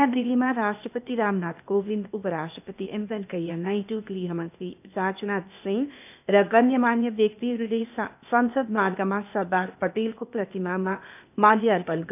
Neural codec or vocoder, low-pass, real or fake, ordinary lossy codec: codec, 16 kHz, 0.8 kbps, ZipCodec; 3.6 kHz; fake; none